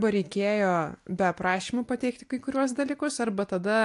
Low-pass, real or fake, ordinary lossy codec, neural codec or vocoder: 10.8 kHz; real; AAC, 64 kbps; none